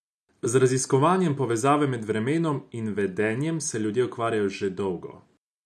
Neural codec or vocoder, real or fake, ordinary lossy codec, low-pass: none; real; none; none